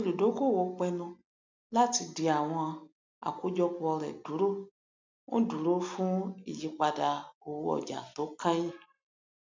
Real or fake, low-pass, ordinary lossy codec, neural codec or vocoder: real; 7.2 kHz; MP3, 64 kbps; none